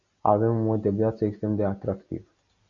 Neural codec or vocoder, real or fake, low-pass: none; real; 7.2 kHz